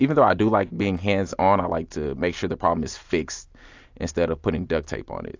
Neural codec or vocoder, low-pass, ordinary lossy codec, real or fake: none; 7.2 kHz; AAC, 48 kbps; real